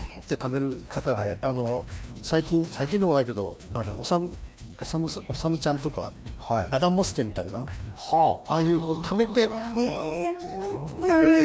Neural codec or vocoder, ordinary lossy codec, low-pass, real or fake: codec, 16 kHz, 1 kbps, FreqCodec, larger model; none; none; fake